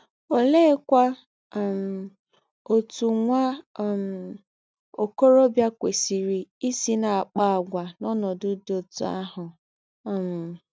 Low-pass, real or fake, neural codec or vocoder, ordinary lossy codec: none; real; none; none